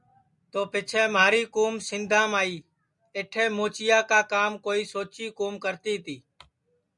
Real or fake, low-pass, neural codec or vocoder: real; 10.8 kHz; none